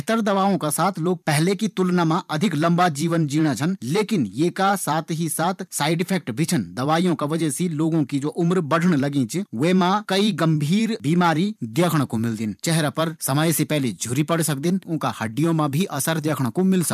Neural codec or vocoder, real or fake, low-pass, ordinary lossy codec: vocoder, 44.1 kHz, 128 mel bands every 512 samples, BigVGAN v2; fake; 14.4 kHz; none